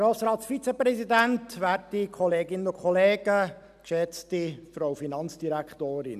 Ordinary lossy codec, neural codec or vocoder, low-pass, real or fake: none; none; 14.4 kHz; real